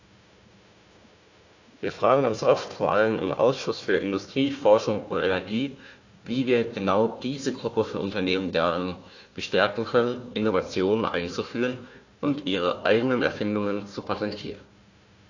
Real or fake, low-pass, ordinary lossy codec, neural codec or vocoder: fake; 7.2 kHz; AAC, 48 kbps; codec, 16 kHz, 1 kbps, FunCodec, trained on Chinese and English, 50 frames a second